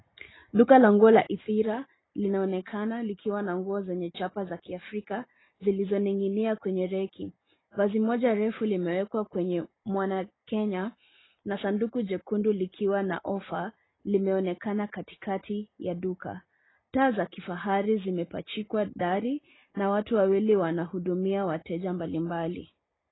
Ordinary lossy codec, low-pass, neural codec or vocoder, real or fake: AAC, 16 kbps; 7.2 kHz; none; real